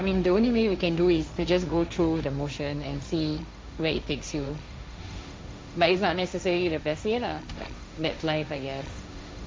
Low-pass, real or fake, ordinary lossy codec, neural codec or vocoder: none; fake; none; codec, 16 kHz, 1.1 kbps, Voila-Tokenizer